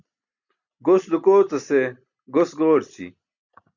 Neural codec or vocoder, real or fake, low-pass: none; real; 7.2 kHz